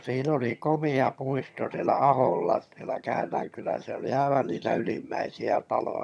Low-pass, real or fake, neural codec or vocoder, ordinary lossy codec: none; fake; vocoder, 22.05 kHz, 80 mel bands, HiFi-GAN; none